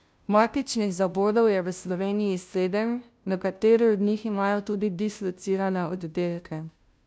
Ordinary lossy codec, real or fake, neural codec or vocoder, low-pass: none; fake; codec, 16 kHz, 0.5 kbps, FunCodec, trained on Chinese and English, 25 frames a second; none